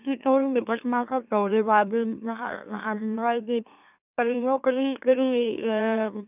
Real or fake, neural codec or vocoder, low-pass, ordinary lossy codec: fake; autoencoder, 44.1 kHz, a latent of 192 numbers a frame, MeloTTS; 3.6 kHz; none